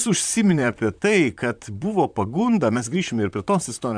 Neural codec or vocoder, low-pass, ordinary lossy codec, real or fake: vocoder, 44.1 kHz, 128 mel bands, Pupu-Vocoder; 9.9 kHz; MP3, 96 kbps; fake